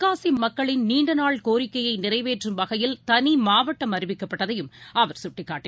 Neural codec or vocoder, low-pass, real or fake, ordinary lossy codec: none; none; real; none